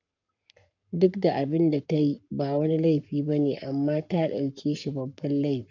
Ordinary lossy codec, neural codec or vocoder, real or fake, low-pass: none; codec, 44.1 kHz, 7.8 kbps, Pupu-Codec; fake; 7.2 kHz